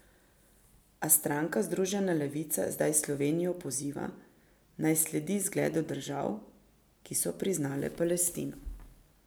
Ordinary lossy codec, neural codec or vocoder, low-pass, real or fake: none; none; none; real